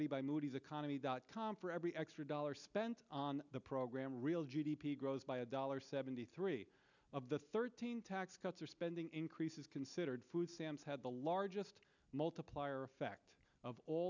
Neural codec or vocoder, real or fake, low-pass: none; real; 7.2 kHz